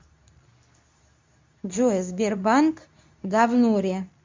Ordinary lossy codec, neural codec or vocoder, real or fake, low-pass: MP3, 64 kbps; codec, 24 kHz, 0.9 kbps, WavTokenizer, medium speech release version 2; fake; 7.2 kHz